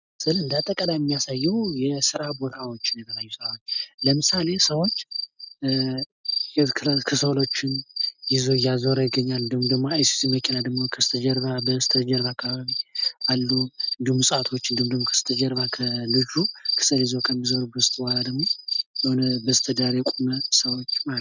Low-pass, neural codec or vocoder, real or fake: 7.2 kHz; none; real